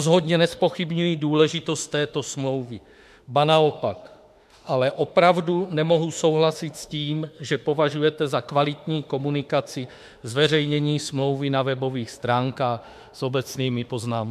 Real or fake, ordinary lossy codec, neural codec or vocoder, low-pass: fake; MP3, 96 kbps; autoencoder, 48 kHz, 32 numbers a frame, DAC-VAE, trained on Japanese speech; 14.4 kHz